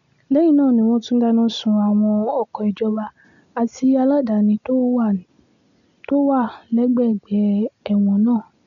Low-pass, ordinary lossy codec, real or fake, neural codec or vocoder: 7.2 kHz; MP3, 96 kbps; real; none